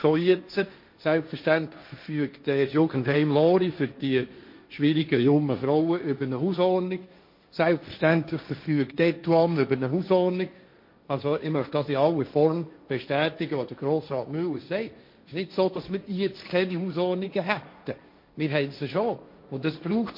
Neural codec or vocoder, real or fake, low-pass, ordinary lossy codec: codec, 16 kHz, 1.1 kbps, Voila-Tokenizer; fake; 5.4 kHz; MP3, 32 kbps